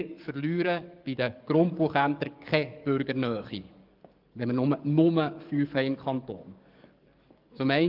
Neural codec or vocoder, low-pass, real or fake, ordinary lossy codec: codec, 44.1 kHz, 7.8 kbps, DAC; 5.4 kHz; fake; Opus, 24 kbps